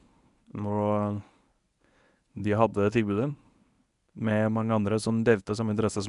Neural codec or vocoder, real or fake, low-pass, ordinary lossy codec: codec, 24 kHz, 0.9 kbps, WavTokenizer, medium speech release version 1; fake; 10.8 kHz; none